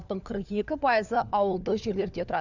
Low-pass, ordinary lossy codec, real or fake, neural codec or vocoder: 7.2 kHz; none; fake; codec, 16 kHz, 4 kbps, FunCodec, trained on LibriTTS, 50 frames a second